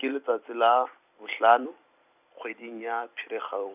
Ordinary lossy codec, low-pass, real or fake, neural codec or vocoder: none; 3.6 kHz; fake; vocoder, 44.1 kHz, 128 mel bands every 256 samples, BigVGAN v2